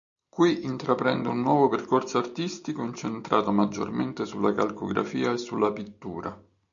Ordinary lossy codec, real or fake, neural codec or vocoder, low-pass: MP3, 96 kbps; real; none; 7.2 kHz